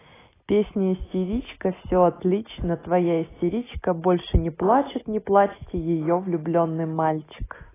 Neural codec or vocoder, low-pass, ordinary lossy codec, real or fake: none; 3.6 kHz; AAC, 16 kbps; real